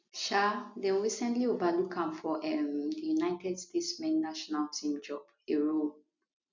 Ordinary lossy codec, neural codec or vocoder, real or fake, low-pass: MP3, 64 kbps; none; real; 7.2 kHz